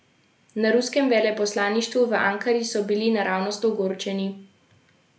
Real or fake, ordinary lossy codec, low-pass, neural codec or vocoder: real; none; none; none